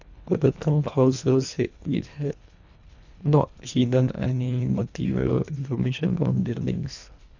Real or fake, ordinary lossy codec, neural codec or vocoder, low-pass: fake; none; codec, 24 kHz, 1.5 kbps, HILCodec; 7.2 kHz